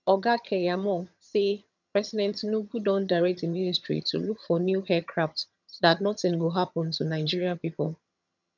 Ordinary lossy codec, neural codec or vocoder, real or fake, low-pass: none; vocoder, 22.05 kHz, 80 mel bands, HiFi-GAN; fake; 7.2 kHz